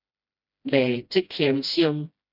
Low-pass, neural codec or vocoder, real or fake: 5.4 kHz; codec, 16 kHz, 1 kbps, FreqCodec, smaller model; fake